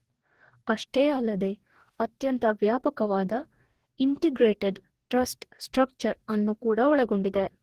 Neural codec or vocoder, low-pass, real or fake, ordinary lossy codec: codec, 44.1 kHz, 2.6 kbps, DAC; 14.4 kHz; fake; Opus, 16 kbps